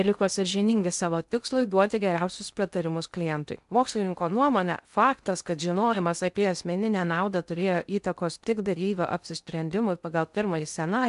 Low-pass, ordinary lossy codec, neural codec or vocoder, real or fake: 10.8 kHz; MP3, 96 kbps; codec, 16 kHz in and 24 kHz out, 0.6 kbps, FocalCodec, streaming, 2048 codes; fake